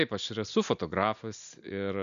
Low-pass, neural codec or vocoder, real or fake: 7.2 kHz; none; real